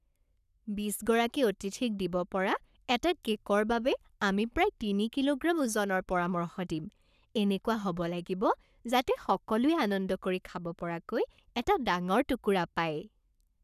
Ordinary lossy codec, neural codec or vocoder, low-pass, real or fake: none; codec, 44.1 kHz, 7.8 kbps, Pupu-Codec; 14.4 kHz; fake